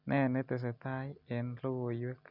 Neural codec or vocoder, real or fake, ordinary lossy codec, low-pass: none; real; none; 5.4 kHz